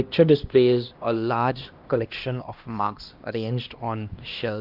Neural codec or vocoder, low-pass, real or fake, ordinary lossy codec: codec, 16 kHz, 1 kbps, X-Codec, HuBERT features, trained on LibriSpeech; 5.4 kHz; fake; Opus, 24 kbps